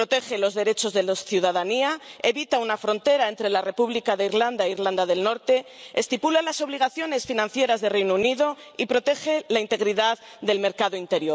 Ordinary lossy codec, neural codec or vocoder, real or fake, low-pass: none; none; real; none